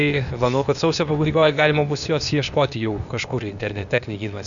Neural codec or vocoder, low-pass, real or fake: codec, 16 kHz, 0.8 kbps, ZipCodec; 7.2 kHz; fake